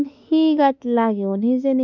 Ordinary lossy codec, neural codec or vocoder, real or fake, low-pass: none; autoencoder, 48 kHz, 32 numbers a frame, DAC-VAE, trained on Japanese speech; fake; 7.2 kHz